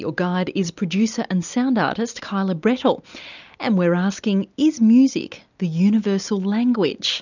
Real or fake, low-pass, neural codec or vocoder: real; 7.2 kHz; none